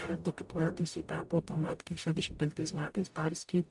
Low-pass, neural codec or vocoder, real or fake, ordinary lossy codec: 10.8 kHz; codec, 44.1 kHz, 0.9 kbps, DAC; fake; AAC, 64 kbps